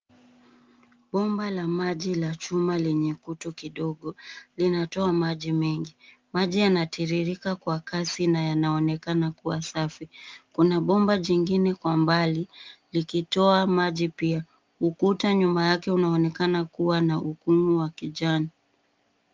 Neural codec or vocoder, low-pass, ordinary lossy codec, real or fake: none; 7.2 kHz; Opus, 32 kbps; real